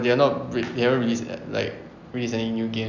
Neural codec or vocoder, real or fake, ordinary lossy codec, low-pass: none; real; none; 7.2 kHz